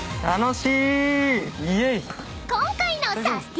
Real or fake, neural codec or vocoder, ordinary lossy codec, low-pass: real; none; none; none